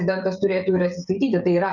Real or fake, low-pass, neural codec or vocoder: fake; 7.2 kHz; codec, 16 kHz, 16 kbps, FreqCodec, smaller model